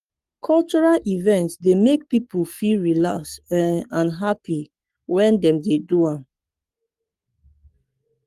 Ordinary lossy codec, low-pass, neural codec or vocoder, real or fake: Opus, 32 kbps; 14.4 kHz; codec, 44.1 kHz, 7.8 kbps, Pupu-Codec; fake